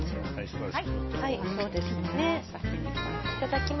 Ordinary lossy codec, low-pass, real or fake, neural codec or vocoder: MP3, 24 kbps; 7.2 kHz; real; none